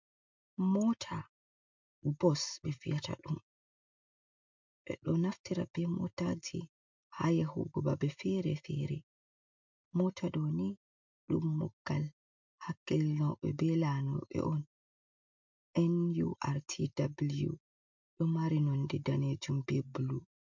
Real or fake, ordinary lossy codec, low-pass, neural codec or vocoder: real; MP3, 64 kbps; 7.2 kHz; none